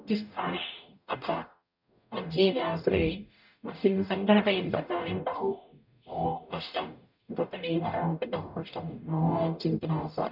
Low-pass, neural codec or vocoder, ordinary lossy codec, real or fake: 5.4 kHz; codec, 44.1 kHz, 0.9 kbps, DAC; none; fake